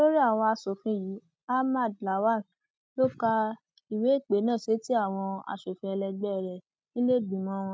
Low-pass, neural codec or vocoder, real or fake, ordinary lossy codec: none; none; real; none